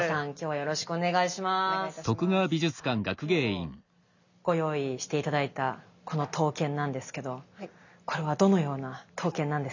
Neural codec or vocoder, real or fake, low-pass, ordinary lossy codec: none; real; 7.2 kHz; none